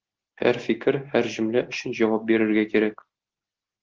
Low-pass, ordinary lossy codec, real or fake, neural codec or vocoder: 7.2 kHz; Opus, 16 kbps; real; none